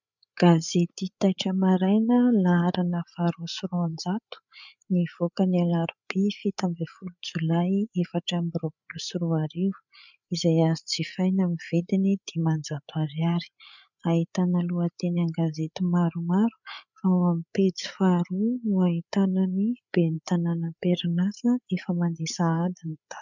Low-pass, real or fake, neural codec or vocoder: 7.2 kHz; fake; codec, 16 kHz, 8 kbps, FreqCodec, larger model